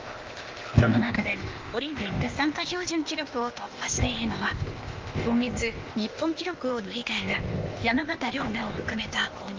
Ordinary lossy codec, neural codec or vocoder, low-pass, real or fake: Opus, 24 kbps; codec, 16 kHz, 0.8 kbps, ZipCodec; 7.2 kHz; fake